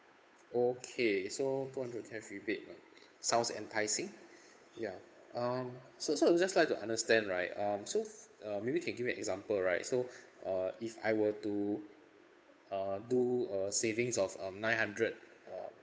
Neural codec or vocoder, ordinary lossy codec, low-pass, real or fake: codec, 16 kHz, 8 kbps, FunCodec, trained on Chinese and English, 25 frames a second; none; none; fake